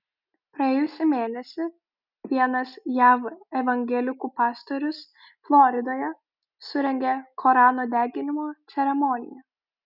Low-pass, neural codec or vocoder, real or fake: 5.4 kHz; none; real